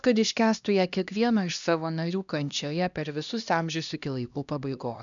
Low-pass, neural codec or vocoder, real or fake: 7.2 kHz; codec, 16 kHz, 1 kbps, X-Codec, HuBERT features, trained on LibriSpeech; fake